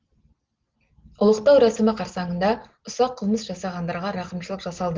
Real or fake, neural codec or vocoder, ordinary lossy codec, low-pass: real; none; Opus, 16 kbps; 7.2 kHz